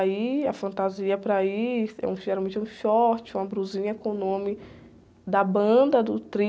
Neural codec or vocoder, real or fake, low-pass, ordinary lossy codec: none; real; none; none